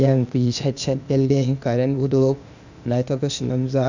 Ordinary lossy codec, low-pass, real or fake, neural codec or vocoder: none; 7.2 kHz; fake; codec, 16 kHz, 0.8 kbps, ZipCodec